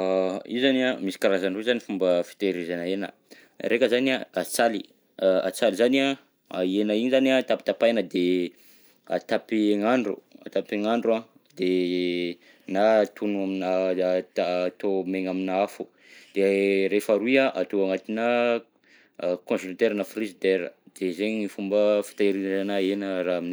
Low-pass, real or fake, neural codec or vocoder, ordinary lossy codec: none; real; none; none